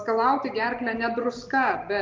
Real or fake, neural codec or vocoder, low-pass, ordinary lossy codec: real; none; 7.2 kHz; Opus, 32 kbps